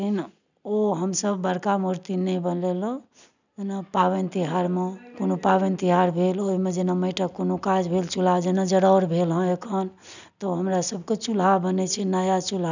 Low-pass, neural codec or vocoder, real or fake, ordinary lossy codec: 7.2 kHz; none; real; none